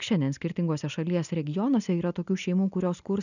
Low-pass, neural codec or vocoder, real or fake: 7.2 kHz; none; real